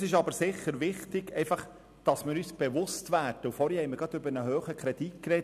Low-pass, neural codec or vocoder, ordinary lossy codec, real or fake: 14.4 kHz; none; none; real